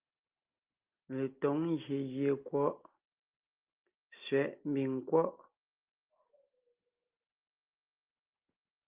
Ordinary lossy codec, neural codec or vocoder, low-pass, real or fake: Opus, 32 kbps; none; 3.6 kHz; real